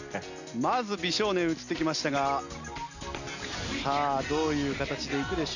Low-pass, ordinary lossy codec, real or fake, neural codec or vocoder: 7.2 kHz; none; real; none